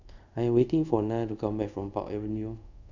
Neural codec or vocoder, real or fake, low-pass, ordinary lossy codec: codec, 24 kHz, 0.5 kbps, DualCodec; fake; 7.2 kHz; none